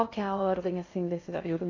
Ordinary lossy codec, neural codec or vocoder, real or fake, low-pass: AAC, 32 kbps; codec, 16 kHz in and 24 kHz out, 0.6 kbps, FocalCodec, streaming, 2048 codes; fake; 7.2 kHz